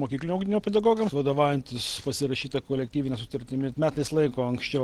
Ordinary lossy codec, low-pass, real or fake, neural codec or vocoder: Opus, 16 kbps; 19.8 kHz; real; none